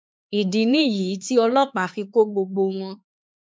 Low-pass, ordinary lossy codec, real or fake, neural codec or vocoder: none; none; fake; codec, 16 kHz, 4 kbps, X-Codec, HuBERT features, trained on LibriSpeech